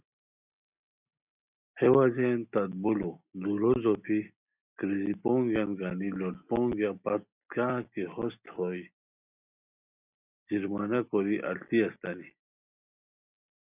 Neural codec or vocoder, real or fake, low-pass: none; real; 3.6 kHz